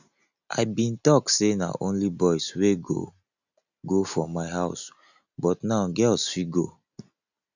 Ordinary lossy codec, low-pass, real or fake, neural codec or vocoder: none; 7.2 kHz; real; none